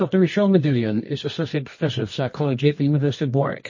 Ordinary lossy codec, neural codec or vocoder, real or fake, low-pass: MP3, 32 kbps; codec, 24 kHz, 0.9 kbps, WavTokenizer, medium music audio release; fake; 7.2 kHz